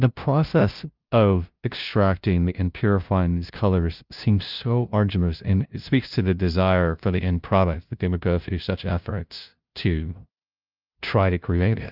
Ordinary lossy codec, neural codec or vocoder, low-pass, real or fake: Opus, 24 kbps; codec, 16 kHz, 0.5 kbps, FunCodec, trained on LibriTTS, 25 frames a second; 5.4 kHz; fake